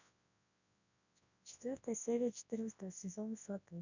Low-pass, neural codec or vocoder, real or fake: 7.2 kHz; codec, 24 kHz, 0.9 kbps, WavTokenizer, large speech release; fake